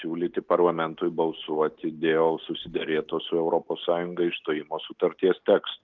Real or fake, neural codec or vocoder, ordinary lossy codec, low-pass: real; none; Opus, 32 kbps; 7.2 kHz